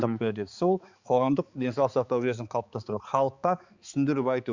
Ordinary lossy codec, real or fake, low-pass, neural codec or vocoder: Opus, 64 kbps; fake; 7.2 kHz; codec, 16 kHz, 4 kbps, X-Codec, HuBERT features, trained on balanced general audio